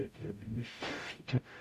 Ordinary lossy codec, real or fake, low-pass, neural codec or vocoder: none; fake; 14.4 kHz; codec, 44.1 kHz, 0.9 kbps, DAC